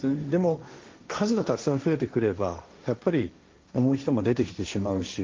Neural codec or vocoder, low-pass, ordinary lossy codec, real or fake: codec, 16 kHz, 1.1 kbps, Voila-Tokenizer; 7.2 kHz; Opus, 24 kbps; fake